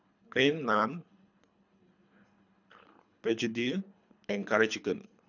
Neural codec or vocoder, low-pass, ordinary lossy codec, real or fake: codec, 24 kHz, 3 kbps, HILCodec; 7.2 kHz; none; fake